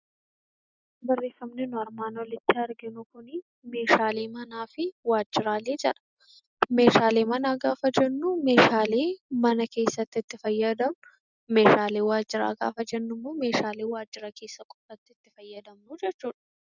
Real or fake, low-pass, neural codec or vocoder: real; 7.2 kHz; none